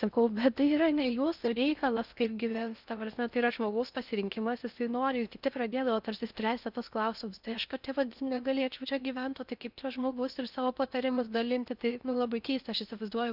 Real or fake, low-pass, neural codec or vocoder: fake; 5.4 kHz; codec, 16 kHz in and 24 kHz out, 0.6 kbps, FocalCodec, streaming, 4096 codes